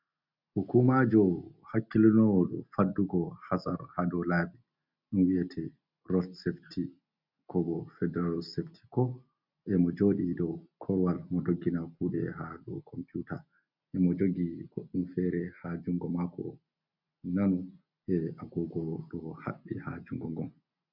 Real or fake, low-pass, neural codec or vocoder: real; 5.4 kHz; none